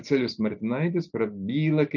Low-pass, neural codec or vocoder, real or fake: 7.2 kHz; none; real